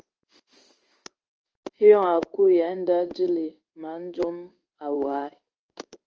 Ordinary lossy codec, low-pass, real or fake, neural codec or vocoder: Opus, 24 kbps; 7.2 kHz; fake; codec, 16 kHz in and 24 kHz out, 1 kbps, XY-Tokenizer